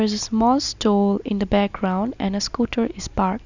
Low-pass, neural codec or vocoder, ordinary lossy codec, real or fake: 7.2 kHz; none; none; real